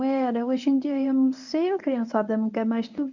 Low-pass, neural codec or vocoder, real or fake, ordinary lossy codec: 7.2 kHz; codec, 24 kHz, 0.9 kbps, WavTokenizer, medium speech release version 1; fake; none